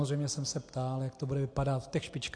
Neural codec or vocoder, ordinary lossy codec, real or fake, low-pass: none; AAC, 48 kbps; real; 9.9 kHz